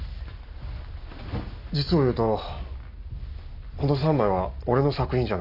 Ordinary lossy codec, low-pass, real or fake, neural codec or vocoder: none; 5.4 kHz; real; none